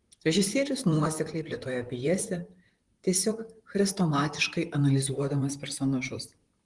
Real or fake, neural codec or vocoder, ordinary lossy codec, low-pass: fake; vocoder, 44.1 kHz, 128 mel bands, Pupu-Vocoder; Opus, 24 kbps; 10.8 kHz